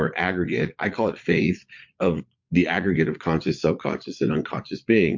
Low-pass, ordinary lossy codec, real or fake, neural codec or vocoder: 7.2 kHz; MP3, 48 kbps; fake; vocoder, 22.05 kHz, 80 mel bands, Vocos